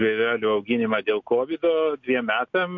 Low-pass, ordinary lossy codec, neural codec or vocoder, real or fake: 7.2 kHz; MP3, 48 kbps; none; real